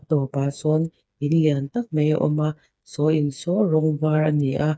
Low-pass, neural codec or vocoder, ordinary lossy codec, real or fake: none; codec, 16 kHz, 4 kbps, FreqCodec, smaller model; none; fake